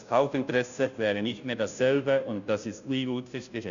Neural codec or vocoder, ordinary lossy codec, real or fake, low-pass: codec, 16 kHz, 0.5 kbps, FunCodec, trained on Chinese and English, 25 frames a second; none; fake; 7.2 kHz